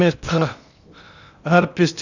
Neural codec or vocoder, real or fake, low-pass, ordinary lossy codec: codec, 16 kHz in and 24 kHz out, 0.8 kbps, FocalCodec, streaming, 65536 codes; fake; 7.2 kHz; none